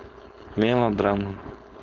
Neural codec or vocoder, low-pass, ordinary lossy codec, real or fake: codec, 16 kHz, 4.8 kbps, FACodec; 7.2 kHz; Opus, 32 kbps; fake